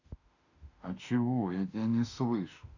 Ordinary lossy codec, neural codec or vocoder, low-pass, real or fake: none; autoencoder, 48 kHz, 32 numbers a frame, DAC-VAE, trained on Japanese speech; 7.2 kHz; fake